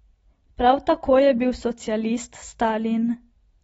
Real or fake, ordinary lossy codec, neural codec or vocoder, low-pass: real; AAC, 24 kbps; none; 19.8 kHz